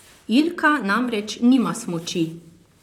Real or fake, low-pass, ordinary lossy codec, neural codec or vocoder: fake; 19.8 kHz; none; vocoder, 44.1 kHz, 128 mel bands, Pupu-Vocoder